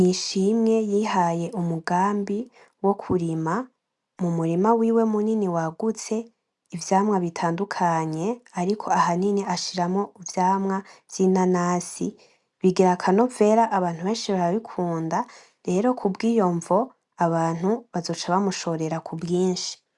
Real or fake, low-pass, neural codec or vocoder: real; 10.8 kHz; none